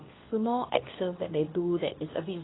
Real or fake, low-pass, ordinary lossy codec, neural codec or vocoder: fake; 7.2 kHz; AAC, 16 kbps; codec, 24 kHz, 0.9 kbps, WavTokenizer, medium speech release version 2